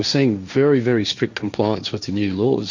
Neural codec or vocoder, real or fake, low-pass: codec, 16 kHz, 1.1 kbps, Voila-Tokenizer; fake; 7.2 kHz